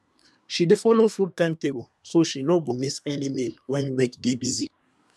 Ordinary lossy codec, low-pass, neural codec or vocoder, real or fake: none; none; codec, 24 kHz, 1 kbps, SNAC; fake